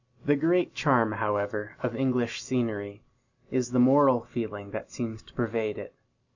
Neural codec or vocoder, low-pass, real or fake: none; 7.2 kHz; real